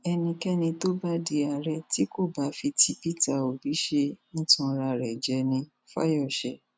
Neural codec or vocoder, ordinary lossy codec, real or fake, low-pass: none; none; real; none